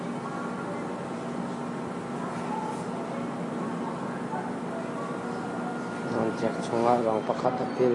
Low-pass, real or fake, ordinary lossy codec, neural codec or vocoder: 10.8 kHz; real; AAC, 32 kbps; none